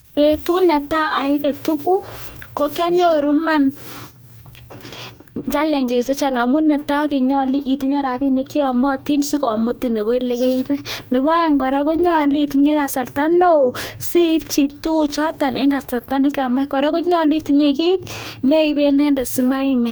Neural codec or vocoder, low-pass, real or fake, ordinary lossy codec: codec, 44.1 kHz, 2.6 kbps, DAC; none; fake; none